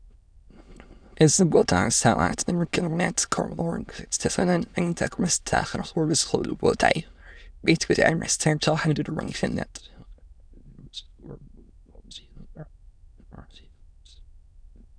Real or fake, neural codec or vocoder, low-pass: fake; autoencoder, 22.05 kHz, a latent of 192 numbers a frame, VITS, trained on many speakers; 9.9 kHz